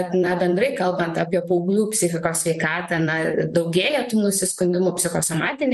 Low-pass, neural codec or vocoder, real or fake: 14.4 kHz; vocoder, 44.1 kHz, 128 mel bands, Pupu-Vocoder; fake